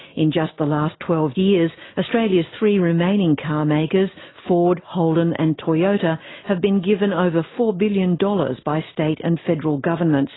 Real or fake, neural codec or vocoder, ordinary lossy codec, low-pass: real; none; AAC, 16 kbps; 7.2 kHz